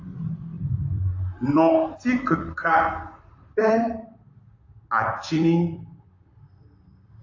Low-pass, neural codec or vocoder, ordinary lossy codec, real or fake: 7.2 kHz; vocoder, 44.1 kHz, 128 mel bands, Pupu-Vocoder; AAC, 48 kbps; fake